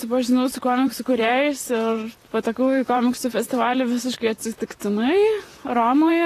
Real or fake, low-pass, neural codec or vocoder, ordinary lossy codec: fake; 14.4 kHz; vocoder, 44.1 kHz, 128 mel bands, Pupu-Vocoder; AAC, 48 kbps